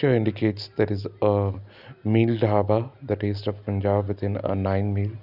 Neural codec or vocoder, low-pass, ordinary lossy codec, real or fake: none; 5.4 kHz; none; real